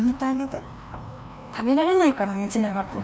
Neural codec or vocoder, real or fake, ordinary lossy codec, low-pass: codec, 16 kHz, 1 kbps, FreqCodec, larger model; fake; none; none